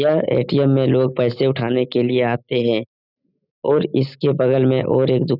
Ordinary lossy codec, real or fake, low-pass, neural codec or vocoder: none; real; 5.4 kHz; none